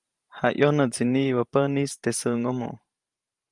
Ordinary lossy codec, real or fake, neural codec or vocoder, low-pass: Opus, 32 kbps; fake; vocoder, 44.1 kHz, 128 mel bands every 512 samples, BigVGAN v2; 10.8 kHz